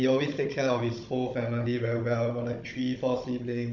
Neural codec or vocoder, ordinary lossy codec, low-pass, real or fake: codec, 16 kHz, 16 kbps, FunCodec, trained on Chinese and English, 50 frames a second; none; 7.2 kHz; fake